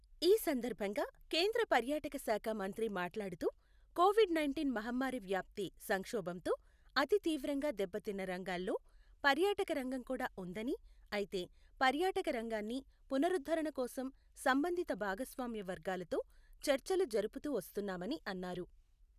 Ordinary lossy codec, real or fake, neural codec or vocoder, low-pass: none; real; none; 14.4 kHz